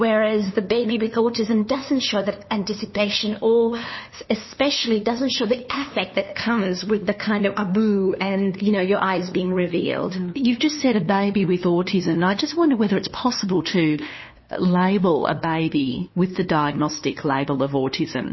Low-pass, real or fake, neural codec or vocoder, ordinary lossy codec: 7.2 kHz; fake; codec, 16 kHz, 2 kbps, FunCodec, trained on LibriTTS, 25 frames a second; MP3, 24 kbps